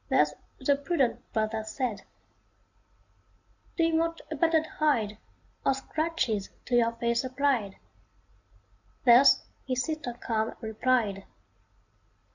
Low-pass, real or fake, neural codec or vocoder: 7.2 kHz; real; none